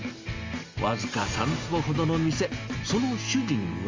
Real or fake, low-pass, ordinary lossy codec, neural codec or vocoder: real; 7.2 kHz; Opus, 32 kbps; none